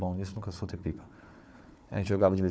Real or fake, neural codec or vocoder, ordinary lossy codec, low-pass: fake; codec, 16 kHz, 4 kbps, FunCodec, trained on Chinese and English, 50 frames a second; none; none